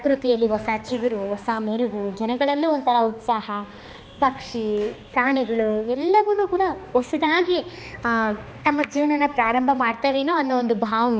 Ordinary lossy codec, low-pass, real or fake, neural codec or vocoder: none; none; fake; codec, 16 kHz, 2 kbps, X-Codec, HuBERT features, trained on balanced general audio